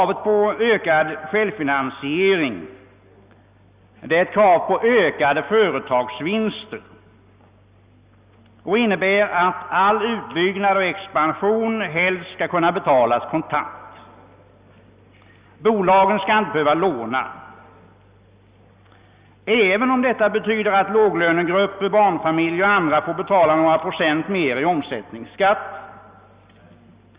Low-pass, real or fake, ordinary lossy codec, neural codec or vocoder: 3.6 kHz; real; Opus, 64 kbps; none